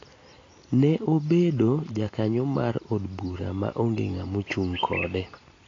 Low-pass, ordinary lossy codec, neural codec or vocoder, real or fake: 7.2 kHz; AAC, 32 kbps; none; real